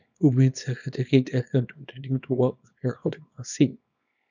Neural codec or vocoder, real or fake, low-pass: codec, 24 kHz, 0.9 kbps, WavTokenizer, small release; fake; 7.2 kHz